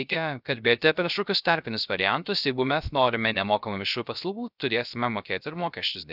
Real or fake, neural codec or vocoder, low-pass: fake; codec, 16 kHz, 0.3 kbps, FocalCodec; 5.4 kHz